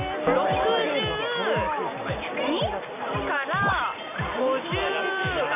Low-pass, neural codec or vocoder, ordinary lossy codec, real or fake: 3.6 kHz; none; none; real